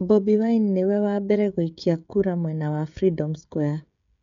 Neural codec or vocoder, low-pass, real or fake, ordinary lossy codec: codec, 16 kHz, 16 kbps, FreqCodec, smaller model; 7.2 kHz; fake; none